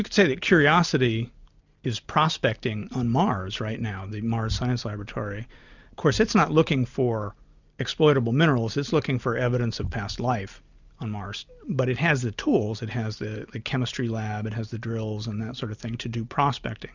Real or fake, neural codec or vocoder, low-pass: real; none; 7.2 kHz